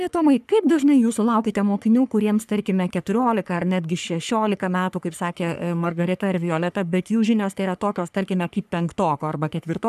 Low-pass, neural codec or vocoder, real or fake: 14.4 kHz; codec, 44.1 kHz, 3.4 kbps, Pupu-Codec; fake